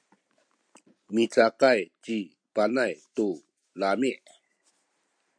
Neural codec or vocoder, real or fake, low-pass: none; real; 9.9 kHz